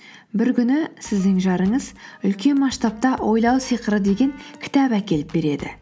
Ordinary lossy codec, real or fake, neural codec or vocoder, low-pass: none; real; none; none